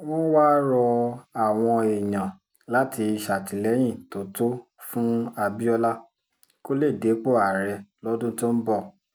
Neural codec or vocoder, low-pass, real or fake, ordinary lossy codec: none; none; real; none